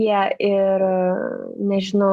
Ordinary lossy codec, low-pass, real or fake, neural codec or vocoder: Opus, 64 kbps; 14.4 kHz; real; none